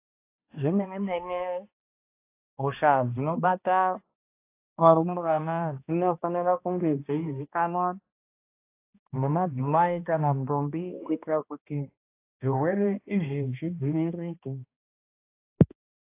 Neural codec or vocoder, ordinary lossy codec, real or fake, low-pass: codec, 16 kHz, 1 kbps, X-Codec, HuBERT features, trained on balanced general audio; AAC, 24 kbps; fake; 3.6 kHz